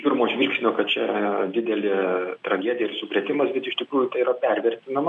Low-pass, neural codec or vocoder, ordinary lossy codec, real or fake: 10.8 kHz; none; MP3, 96 kbps; real